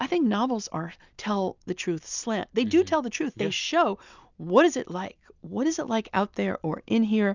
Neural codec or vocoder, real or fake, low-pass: none; real; 7.2 kHz